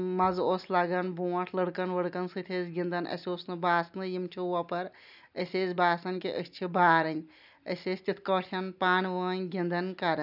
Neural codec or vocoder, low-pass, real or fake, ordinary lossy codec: none; 5.4 kHz; real; none